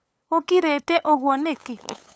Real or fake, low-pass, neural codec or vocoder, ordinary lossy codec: fake; none; codec, 16 kHz, 8 kbps, FunCodec, trained on LibriTTS, 25 frames a second; none